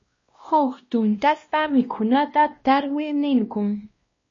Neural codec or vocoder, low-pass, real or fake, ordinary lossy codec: codec, 16 kHz, 1 kbps, X-Codec, WavLM features, trained on Multilingual LibriSpeech; 7.2 kHz; fake; MP3, 32 kbps